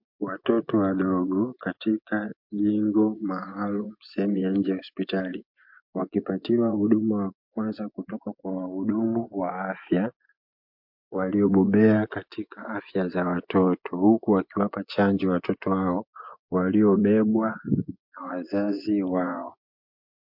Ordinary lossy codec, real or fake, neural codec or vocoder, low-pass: MP3, 48 kbps; fake; vocoder, 24 kHz, 100 mel bands, Vocos; 5.4 kHz